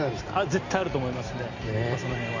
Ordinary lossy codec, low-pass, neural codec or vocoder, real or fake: Opus, 64 kbps; 7.2 kHz; none; real